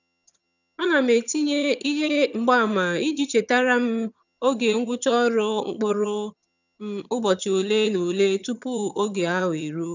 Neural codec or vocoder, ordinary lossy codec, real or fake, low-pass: vocoder, 22.05 kHz, 80 mel bands, HiFi-GAN; none; fake; 7.2 kHz